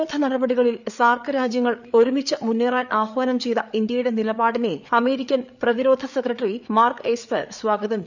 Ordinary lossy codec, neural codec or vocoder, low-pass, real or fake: none; codec, 16 kHz, 8 kbps, FreqCodec, larger model; 7.2 kHz; fake